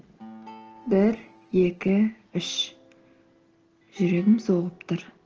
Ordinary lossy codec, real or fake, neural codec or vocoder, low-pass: Opus, 16 kbps; real; none; 7.2 kHz